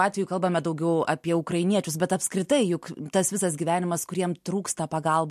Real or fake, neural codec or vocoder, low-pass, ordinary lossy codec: real; none; 14.4 kHz; MP3, 64 kbps